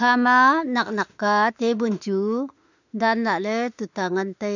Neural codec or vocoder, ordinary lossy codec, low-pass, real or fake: autoencoder, 48 kHz, 32 numbers a frame, DAC-VAE, trained on Japanese speech; none; 7.2 kHz; fake